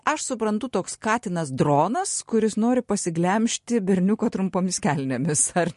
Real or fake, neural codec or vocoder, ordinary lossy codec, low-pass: real; none; MP3, 48 kbps; 14.4 kHz